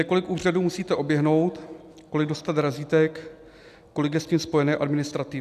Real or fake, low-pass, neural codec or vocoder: real; 14.4 kHz; none